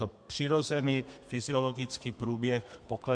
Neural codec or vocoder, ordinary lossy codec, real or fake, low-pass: codec, 32 kHz, 1.9 kbps, SNAC; MP3, 64 kbps; fake; 9.9 kHz